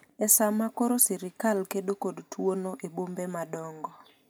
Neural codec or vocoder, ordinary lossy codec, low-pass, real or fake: none; none; none; real